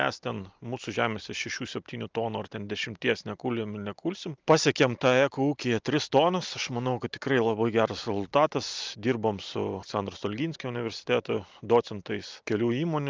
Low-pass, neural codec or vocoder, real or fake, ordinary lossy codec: 7.2 kHz; none; real; Opus, 24 kbps